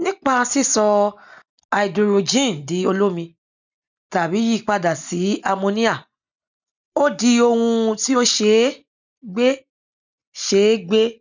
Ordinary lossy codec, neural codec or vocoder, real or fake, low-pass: none; none; real; 7.2 kHz